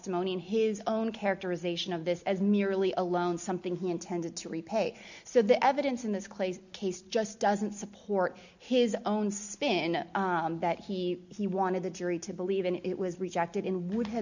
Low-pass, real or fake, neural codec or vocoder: 7.2 kHz; real; none